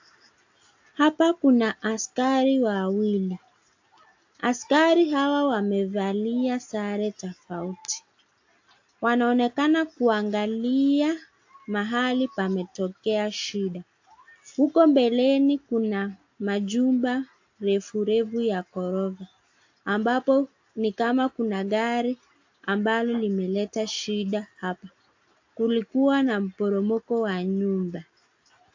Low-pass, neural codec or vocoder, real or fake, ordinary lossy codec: 7.2 kHz; none; real; AAC, 48 kbps